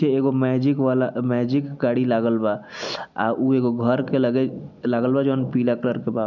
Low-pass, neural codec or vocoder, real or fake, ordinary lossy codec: 7.2 kHz; none; real; none